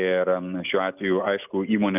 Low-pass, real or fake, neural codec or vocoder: 3.6 kHz; real; none